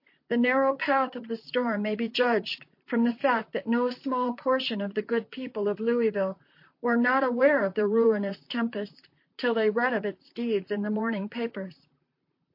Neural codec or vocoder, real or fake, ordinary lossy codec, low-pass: vocoder, 44.1 kHz, 128 mel bands, Pupu-Vocoder; fake; MP3, 32 kbps; 5.4 kHz